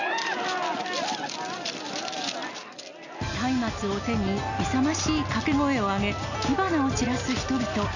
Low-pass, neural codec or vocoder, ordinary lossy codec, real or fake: 7.2 kHz; none; none; real